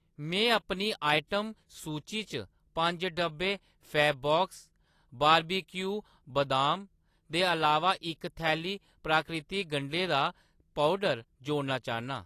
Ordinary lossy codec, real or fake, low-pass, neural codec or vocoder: AAC, 48 kbps; real; 14.4 kHz; none